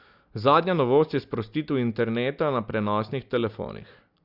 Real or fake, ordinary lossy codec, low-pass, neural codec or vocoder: real; Opus, 64 kbps; 5.4 kHz; none